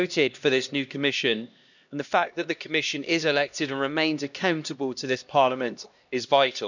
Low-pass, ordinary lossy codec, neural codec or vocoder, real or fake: 7.2 kHz; none; codec, 16 kHz, 1 kbps, X-Codec, HuBERT features, trained on LibriSpeech; fake